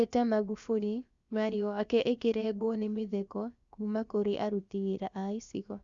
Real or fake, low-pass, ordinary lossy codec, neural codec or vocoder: fake; 7.2 kHz; Opus, 64 kbps; codec, 16 kHz, about 1 kbps, DyCAST, with the encoder's durations